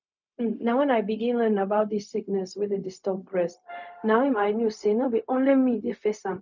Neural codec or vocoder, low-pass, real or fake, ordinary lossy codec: codec, 16 kHz, 0.4 kbps, LongCat-Audio-Codec; 7.2 kHz; fake; none